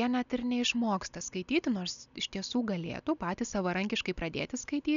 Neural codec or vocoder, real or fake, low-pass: none; real; 7.2 kHz